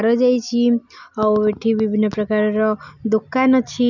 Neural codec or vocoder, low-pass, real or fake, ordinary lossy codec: none; 7.2 kHz; real; none